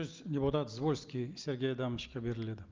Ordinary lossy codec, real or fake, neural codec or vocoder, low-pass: Opus, 32 kbps; real; none; 7.2 kHz